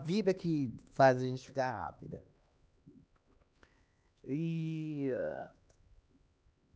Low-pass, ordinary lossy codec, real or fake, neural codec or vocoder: none; none; fake; codec, 16 kHz, 2 kbps, X-Codec, HuBERT features, trained on LibriSpeech